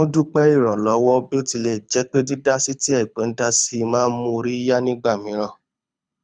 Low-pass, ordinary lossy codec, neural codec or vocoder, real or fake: 9.9 kHz; none; codec, 24 kHz, 6 kbps, HILCodec; fake